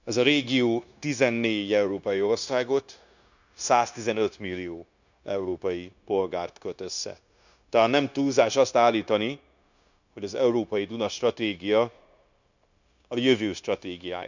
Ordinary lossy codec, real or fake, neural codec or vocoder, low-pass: none; fake; codec, 16 kHz, 0.9 kbps, LongCat-Audio-Codec; 7.2 kHz